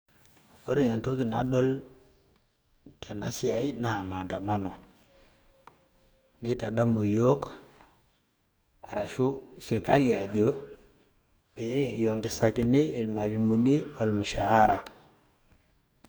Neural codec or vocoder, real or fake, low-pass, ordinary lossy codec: codec, 44.1 kHz, 2.6 kbps, DAC; fake; none; none